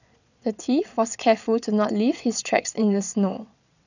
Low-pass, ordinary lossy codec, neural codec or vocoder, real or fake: 7.2 kHz; none; vocoder, 22.05 kHz, 80 mel bands, WaveNeXt; fake